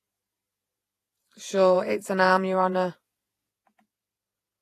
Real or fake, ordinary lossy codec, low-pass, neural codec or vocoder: fake; AAC, 48 kbps; 14.4 kHz; vocoder, 48 kHz, 128 mel bands, Vocos